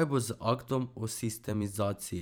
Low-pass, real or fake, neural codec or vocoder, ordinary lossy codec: none; fake; vocoder, 44.1 kHz, 128 mel bands every 512 samples, BigVGAN v2; none